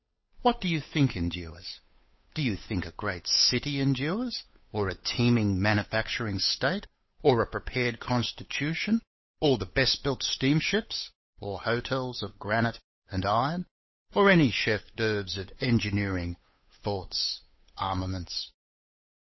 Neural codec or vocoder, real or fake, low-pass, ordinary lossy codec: codec, 16 kHz, 8 kbps, FunCodec, trained on Chinese and English, 25 frames a second; fake; 7.2 kHz; MP3, 24 kbps